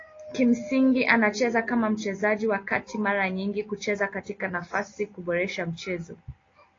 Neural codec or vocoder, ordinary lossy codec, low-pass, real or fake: none; AAC, 32 kbps; 7.2 kHz; real